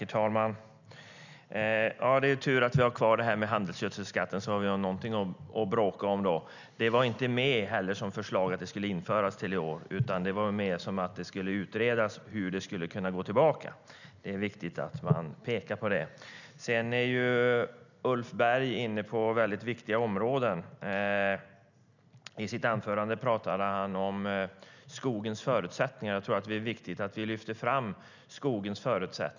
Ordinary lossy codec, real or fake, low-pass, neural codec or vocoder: none; real; 7.2 kHz; none